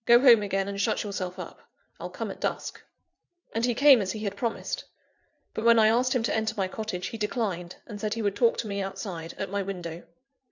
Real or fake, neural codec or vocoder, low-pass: fake; vocoder, 44.1 kHz, 80 mel bands, Vocos; 7.2 kHz